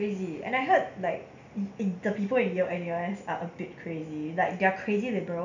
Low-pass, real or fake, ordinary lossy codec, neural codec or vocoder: 7.2 kHz; real; none; none